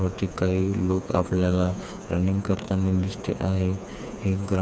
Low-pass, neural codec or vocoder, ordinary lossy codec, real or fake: none; codec, 16 kHz, 4 kbps, FreqCodec, smaller model; none; fake